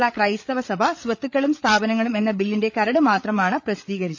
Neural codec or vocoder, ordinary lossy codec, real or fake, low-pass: codec, 16 kHz, 8 kbps, FreqCodec, larger model; none; fake; none